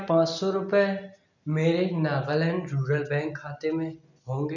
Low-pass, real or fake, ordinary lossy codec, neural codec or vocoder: 7.2 kHz; real; none; none